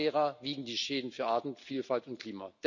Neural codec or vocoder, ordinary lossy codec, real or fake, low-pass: none; none; real; 7.2 kHz